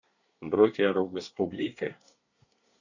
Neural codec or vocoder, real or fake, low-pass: codec, 24 kHz, 1 kbps, SNAC; fake; 7.2 kHz